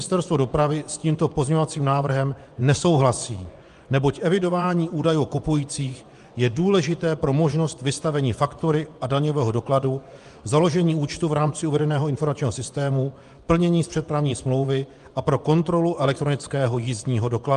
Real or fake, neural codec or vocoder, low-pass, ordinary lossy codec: fake; vocoder, 24 kHz, 100 mel bands, Vocos; 10.8 kHz; Opus, 32 kbps